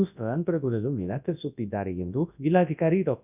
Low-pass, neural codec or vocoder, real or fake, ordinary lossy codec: 3.6 kHz; codec, 24 kHz, 0.9 kbps, WavTokenizer, large speech release; fake; none